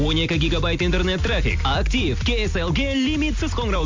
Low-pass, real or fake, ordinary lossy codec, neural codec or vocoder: 7.2 kHz; real; MP3, 48 kbps; none